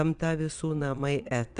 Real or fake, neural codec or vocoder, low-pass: fake; vocoder, 22.05 kHz, 80 mel bands, Vocos; 9.9 kHz